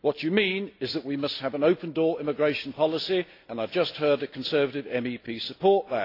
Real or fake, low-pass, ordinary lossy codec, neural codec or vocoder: real; 5.4 kHz; AAC, 32 kbps; none